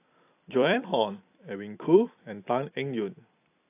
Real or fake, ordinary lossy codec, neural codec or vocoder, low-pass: fake; AAC, 24 kbps; vocoder, 44.1 kHz, 128 mel bands every 256 samples, BigVGAN v2; 3.6 kHz